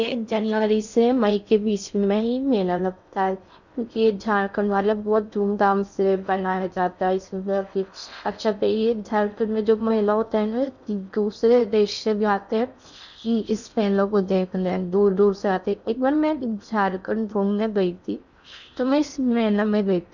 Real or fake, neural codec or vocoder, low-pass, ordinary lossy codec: fake; codec, 16 kHz in and 24 kHz out, 0.6 kbps, FocalCodec, streaming, 4096 codes; 7.2 kHz; none